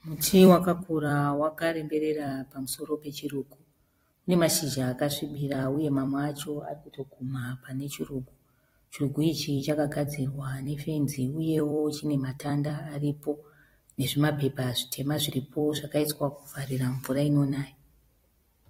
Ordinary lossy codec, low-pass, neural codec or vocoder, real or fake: AAC, 48 kbps; 19.8 kHz; vocoder, 44.1 kHz, 128 mel bands every 256 samples, BigVGAN v2; fake